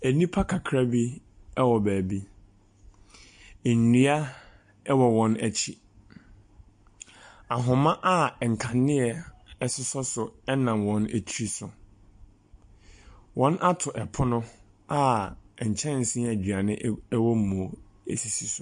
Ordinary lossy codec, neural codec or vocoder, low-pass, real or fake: MP3, 48 kbps; none; 9.9 kHz; real